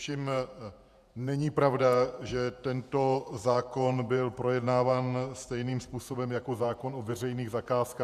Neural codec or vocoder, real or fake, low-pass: vocoder, 48 kHz, 128 mel bands, Vocos; fake; 14.4 kHz